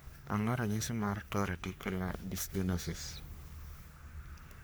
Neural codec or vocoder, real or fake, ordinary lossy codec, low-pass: codec, 44.1 kHz, 3.4 kbps, Pupu-Codec; fake; none; none